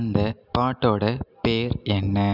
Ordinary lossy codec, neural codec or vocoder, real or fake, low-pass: none; none; real; 5.4 kHz